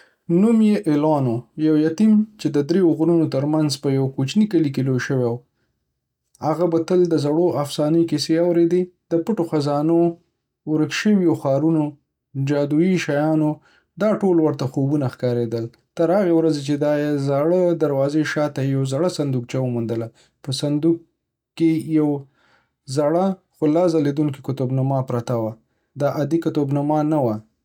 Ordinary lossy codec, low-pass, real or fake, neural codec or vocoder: none; 19.8 kHz; real; none